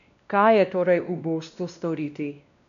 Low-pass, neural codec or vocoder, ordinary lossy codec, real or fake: 7.2 kHz; codec, 16 kHz, 1 kbps, X-Codec, WavLM features, trained on Multilingual LibriSpeech; none; fake